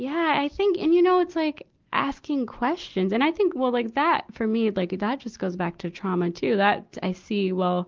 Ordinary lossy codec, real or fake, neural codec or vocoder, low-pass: Opus, 24 kbps; real; none; 7.2 kHz